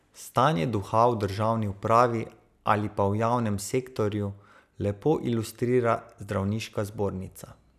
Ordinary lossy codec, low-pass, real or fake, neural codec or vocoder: none; 14.4 kHz; real; none